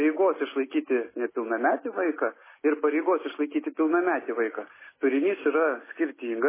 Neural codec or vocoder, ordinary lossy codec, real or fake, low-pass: none; MP3, 16 kbps; real; 3.6 kHz